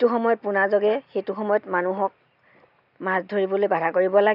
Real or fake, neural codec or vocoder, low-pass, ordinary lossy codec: real; none; 5.4 kHz; none